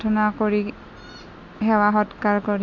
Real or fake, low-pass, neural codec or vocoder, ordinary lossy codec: real; 7.2 kHz; none; none